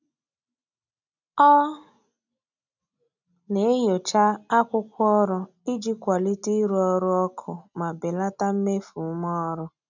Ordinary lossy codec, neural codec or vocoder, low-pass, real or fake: none; none; 7.2 kHz; real